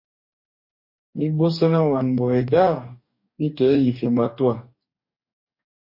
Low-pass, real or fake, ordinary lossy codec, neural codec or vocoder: 5.4 kHz; fake; MP3, 32 kbps; codec, 44.1 kHz, 2.6 kbps, DAC